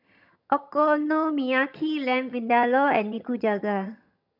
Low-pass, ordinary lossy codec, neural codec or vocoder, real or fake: 5.4 kHz; none; vocoder, 22.05 kHz, 80 mel bands, HiFi-GAN; fake